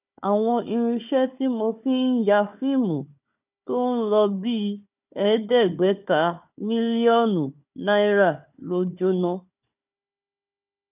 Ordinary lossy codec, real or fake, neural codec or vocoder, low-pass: none; fake; codec, 16 kHz, 4 kbps, FunCodec, trained on Chinese and English, 50 frames a second; 3.6 kHz